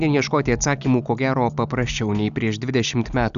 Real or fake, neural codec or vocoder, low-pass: real; none; 7.2 kHz